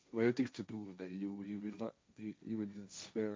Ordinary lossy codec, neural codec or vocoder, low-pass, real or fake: none; codec, 16 kHz, 1.1 kbps, Voila-Tokenizer; none; fake